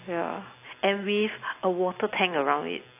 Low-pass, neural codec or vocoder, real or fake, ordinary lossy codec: 3.6 kHz; none; real; AAC, 24 kbps